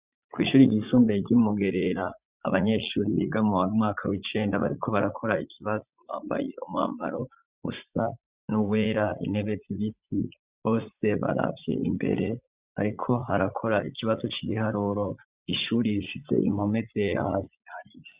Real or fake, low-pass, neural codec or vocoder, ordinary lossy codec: fake; 3.6 kHz; vocoder, 44.1 kHz, 80 mel bands, Vocos; Opus, 64 kbps